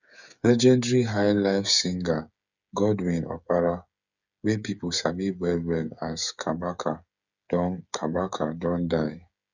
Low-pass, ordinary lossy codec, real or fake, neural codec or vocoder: 7.2 kHz; none; fake; codec, 16 kHz, 8 kbps, FreqCodec, smaller model